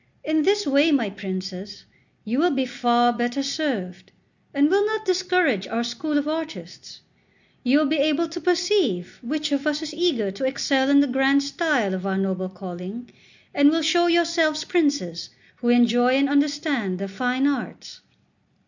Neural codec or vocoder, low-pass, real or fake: none; 7.2 kHz; real